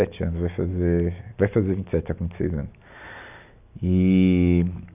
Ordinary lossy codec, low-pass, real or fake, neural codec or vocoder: none; 3.6 kHz; real; none